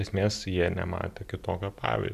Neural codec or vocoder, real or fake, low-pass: none; real; 14.4 kHz